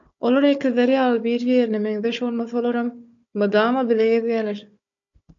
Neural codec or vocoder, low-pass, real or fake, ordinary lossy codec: codec, 16 kHz, 4 kbps, FunCodec, trained on Chinese and English, 50 frames a second; 7.2 kHz; fake; AAC, 64 kbps